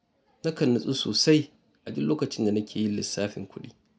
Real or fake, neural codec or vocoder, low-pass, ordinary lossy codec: real; none; none; none